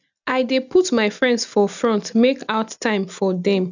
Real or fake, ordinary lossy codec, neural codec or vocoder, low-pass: real; none; none; 7.2 kHz